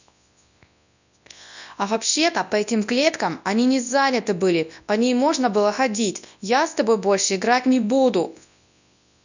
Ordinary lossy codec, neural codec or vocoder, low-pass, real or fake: none; codec, 24 kHz, 0.9 kbps, WavTokenizer, large speech release; 7.2 kHz; fake